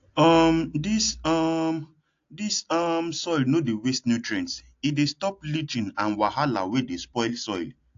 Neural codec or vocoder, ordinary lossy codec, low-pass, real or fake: none; AAC, 48 kbps; 7.2 kHz; real